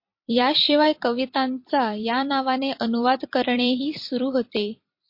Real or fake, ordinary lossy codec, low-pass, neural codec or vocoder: fake; MP3, 32 kbps; 5.4 kHz; vocoder, 44.1 kHz, 128 mel bands every 256 samples, BigVGAN v2